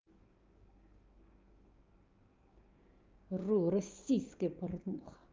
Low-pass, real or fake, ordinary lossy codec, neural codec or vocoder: 7.2 kHz; fake; Opus, 24 kbps; codec, 16 kHz, 6 kbps, DAC